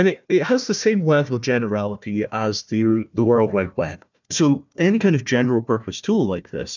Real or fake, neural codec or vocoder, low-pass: fake; codec, 16 kHz, 1 kbps, FunCodec, trained on Chinese and English, 50 frames a second; 7.2 kHz